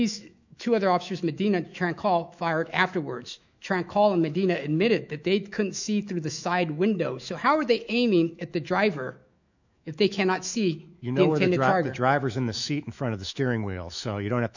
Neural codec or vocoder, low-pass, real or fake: autoencoder, 48 kHz, 128 numbers a frame, DAC-VAE, trained on Japanese speech; 7.2 kHz; fake